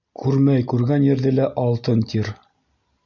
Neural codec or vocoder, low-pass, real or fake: none; 7.2 kHz; real